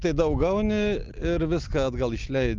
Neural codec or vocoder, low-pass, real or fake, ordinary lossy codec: none; 7.2 kHz; real; Opus, 32 kbps